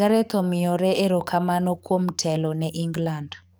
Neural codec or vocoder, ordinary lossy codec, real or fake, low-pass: codec, 44.1 kHz, 7.8 kbps, DAC; none; fake; none